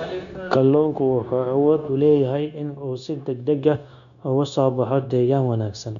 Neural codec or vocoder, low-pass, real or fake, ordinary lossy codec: codec, 16 kHz, 0.9 kbps, LongCat-Audio-Codec; 7.2 kHz; fake; none